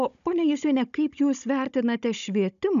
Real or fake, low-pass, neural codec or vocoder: fake; 7.2 kHz; codec, 16 kHz, 16 kbps, FunCodec, trained on Chinese and English, 50 frames a second